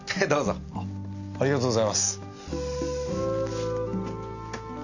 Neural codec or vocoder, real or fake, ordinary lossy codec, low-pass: none; real; none; 7.2 kHz